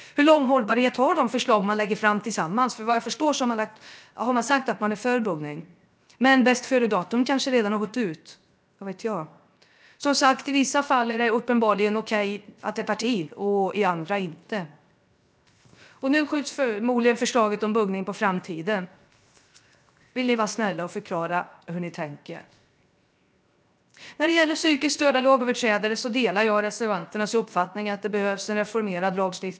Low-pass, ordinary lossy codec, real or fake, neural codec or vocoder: none; none; fake; codec, 16 kHz, 0.7 kbps, FocalCodec